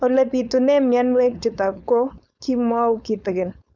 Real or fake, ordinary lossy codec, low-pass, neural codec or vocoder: fake; none; 7.2 kHz; codec, 16 kHz, 4.8 kbps, FACodec